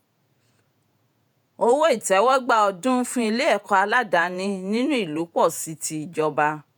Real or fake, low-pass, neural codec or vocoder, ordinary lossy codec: fake; none; vocoder, 48 kHz, 128 mel bands, Vocos; none